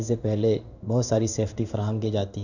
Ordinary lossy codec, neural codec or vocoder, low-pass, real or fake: none; codec, 16 kHz in and 24 kHz out, 1 kbps, XY-Tokenizer; 7.2 kHz; fake